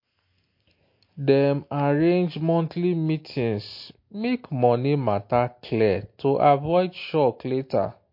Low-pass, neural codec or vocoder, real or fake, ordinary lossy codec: 5.4 kHz; none; real; MP3, 32 kbps